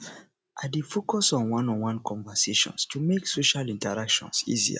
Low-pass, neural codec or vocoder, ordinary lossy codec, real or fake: none; none; none; real